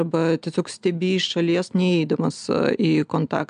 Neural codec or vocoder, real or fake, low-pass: none; real; 9.9 kHz